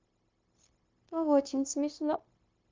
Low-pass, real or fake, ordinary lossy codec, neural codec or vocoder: 7.2 kHz; fake; Opus, 32 kbps; codec, 16 kHz, 0.9 kbps, LongCat-Audio-Codec